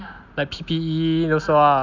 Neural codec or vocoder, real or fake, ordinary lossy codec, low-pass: none; real; none; 7.2 kHz